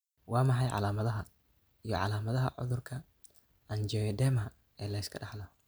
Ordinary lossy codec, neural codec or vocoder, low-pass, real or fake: none; none; none; real